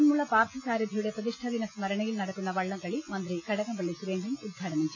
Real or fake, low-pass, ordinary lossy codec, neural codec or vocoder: real; 7.2 kHz; MP3, 48 kbps; none